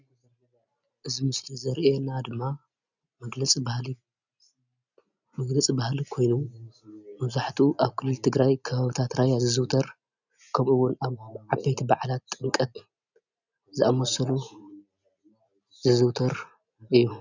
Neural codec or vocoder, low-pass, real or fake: none; 7.2 kHz; real